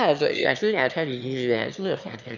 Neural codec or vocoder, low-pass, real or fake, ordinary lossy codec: autoencoder, 22.05 kHz, a latent of 192 numbers a frame, VITS, trained on one speaker; 7.2 kHz; fake; none